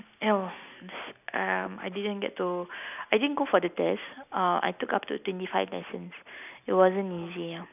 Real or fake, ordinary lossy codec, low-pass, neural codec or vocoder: real; none; 3.6 kHz; none